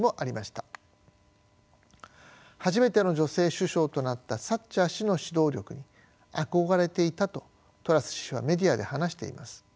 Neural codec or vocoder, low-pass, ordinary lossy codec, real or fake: none; none; none; real